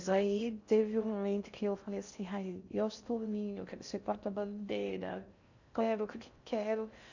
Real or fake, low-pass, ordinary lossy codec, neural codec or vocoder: fake; 7.2 kHz; none; codec, 16 kHz in and 24 kHz out, 0.6 kbps, FocalCodec, streaming, 4096 codes